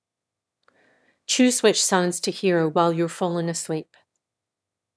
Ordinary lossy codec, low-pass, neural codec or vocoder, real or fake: none; none; autoencoder, 22.05 kHz, a latent of 192 numbers a frame, VITS, trained on one speaker; fake